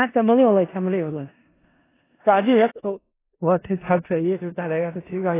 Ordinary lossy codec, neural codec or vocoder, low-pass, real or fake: AAC, 16 kbps; codec, 16 kHz in and 24 kHz out, 0.4 kbps, LongCat-Audio-Codec, four codebook decoder; 3.6 kHz; fake